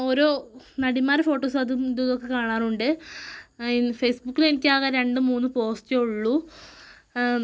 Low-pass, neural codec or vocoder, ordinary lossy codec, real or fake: none; none; none; real